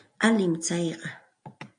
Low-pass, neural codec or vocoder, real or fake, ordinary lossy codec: 9.9 kHz; none; real; AAC, 64 kbps